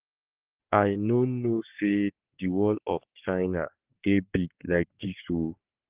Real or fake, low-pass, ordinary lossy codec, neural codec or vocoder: fake; 3.6 kHz; Opus, 16 kbps; codec, 44.1 kHz, 3.4 kbps, Pupu-Codec